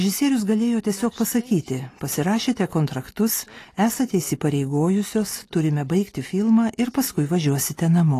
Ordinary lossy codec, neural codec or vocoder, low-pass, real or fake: AAC, 48 kbps; none; 14.4 kHz; real